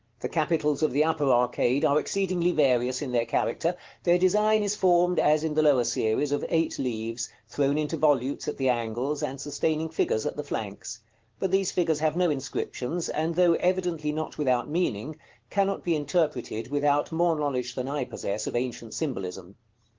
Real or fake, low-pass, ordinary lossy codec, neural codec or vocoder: real; 7.2 kHz; Opus, 16 kbps; none